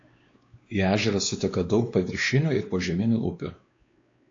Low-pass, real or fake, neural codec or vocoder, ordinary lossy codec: 7.2 kHz; fake; codec, 16 kHz, 2 kbps, X-Codec, WavLM features, trained on Multilingual LibriSpeech; AAC, 48 kbps